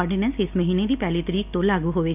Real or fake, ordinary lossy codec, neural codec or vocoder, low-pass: real; none; none; 3.6 kHz